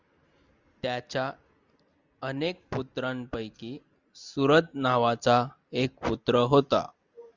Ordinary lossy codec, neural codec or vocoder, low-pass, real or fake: Opus, 64 kbps; none; 7.2 kHz; real